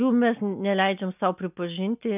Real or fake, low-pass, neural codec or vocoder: real; 3.6 kHz; none